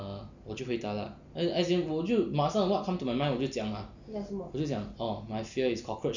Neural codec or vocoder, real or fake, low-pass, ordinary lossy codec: none; real; 7.2 kHz; none